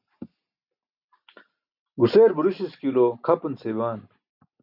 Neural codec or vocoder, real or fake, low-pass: none; real; 5.4 kHz